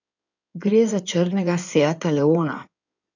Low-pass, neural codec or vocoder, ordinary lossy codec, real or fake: 7.2 kHz; codec, 16 kHz in and 24 kHz out, 2.2 kbps, FireRedTTS-2 codec; none; fake